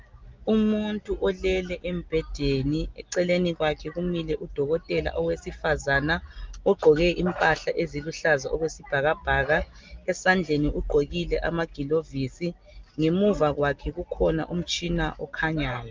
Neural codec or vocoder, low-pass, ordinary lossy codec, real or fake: none; 7.2 kHz; Opus, 32 kbps; real